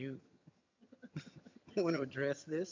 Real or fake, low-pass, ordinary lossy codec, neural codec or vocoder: fake; 7.2 kHz; MP3, 64 kbps; vocoder, 22.05 kHz, 80 mel bands, HiFi-GAN